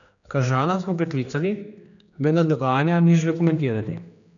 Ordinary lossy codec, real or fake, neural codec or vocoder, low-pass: none; fake; codec, 16 kHz, 2 kbps, X-Codec, HuBERT features, trained on general audio; 7.2 kHz